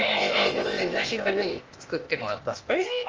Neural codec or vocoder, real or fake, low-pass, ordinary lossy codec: codec, 16 kHz, 0.8 kbps, ZipCodec; fake; 7.2 kHz; Opus, 24 kbps